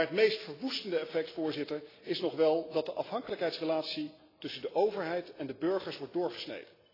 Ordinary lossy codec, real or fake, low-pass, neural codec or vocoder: AAC, 24 kbps; real; 5.4 kHz; none